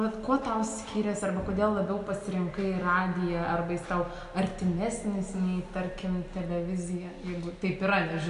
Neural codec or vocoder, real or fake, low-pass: none; real; 10.8 kHz